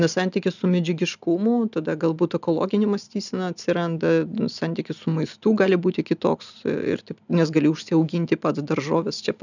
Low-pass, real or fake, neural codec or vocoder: 7.2 kHz; real; none